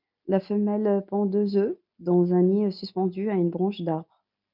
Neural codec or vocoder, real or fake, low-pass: none; real; 5.4 kHz